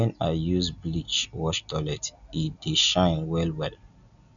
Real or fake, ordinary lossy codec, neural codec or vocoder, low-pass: real; none; none; 7.2 kHz